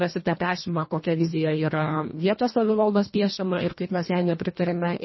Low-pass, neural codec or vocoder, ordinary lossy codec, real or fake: 7.2 kHz; codec, 24 kHz, 1.5 kbps, HILCodec; MP3, 24 kbps; fake